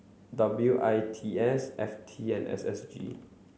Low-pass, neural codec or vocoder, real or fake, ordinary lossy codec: none; none; real; none